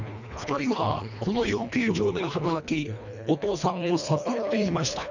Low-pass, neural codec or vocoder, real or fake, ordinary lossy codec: 7.2 kHz; codec, 24 kHz, 1.5 kbps, HILCodec; fake; none